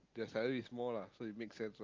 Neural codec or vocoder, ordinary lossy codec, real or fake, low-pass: none; Opus, 32 kbps; real; 7.2 kHz